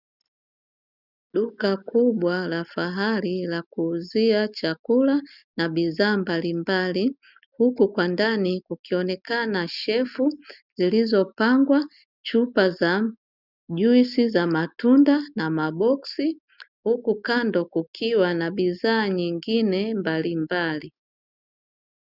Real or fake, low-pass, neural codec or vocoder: real; 5.4 kHz; none